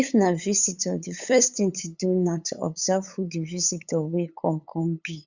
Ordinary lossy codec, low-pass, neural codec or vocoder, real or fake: Opus, 64 kbps; 7.2 kHz; codec, 16 kHz, 8 kbps, FunCodec, trained on LibriTTS, 25 frames a second; fake